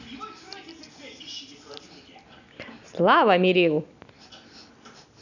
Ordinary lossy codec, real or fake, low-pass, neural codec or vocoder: none; real; 7.2 kHz; none